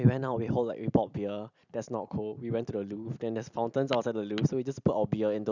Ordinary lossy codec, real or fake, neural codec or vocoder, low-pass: none; real; none; 7.2 kHz